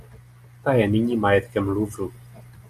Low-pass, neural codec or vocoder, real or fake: 14.4 kHz; none; real